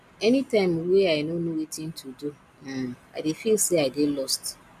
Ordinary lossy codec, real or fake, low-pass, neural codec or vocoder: none; real; 14.4 kHz; none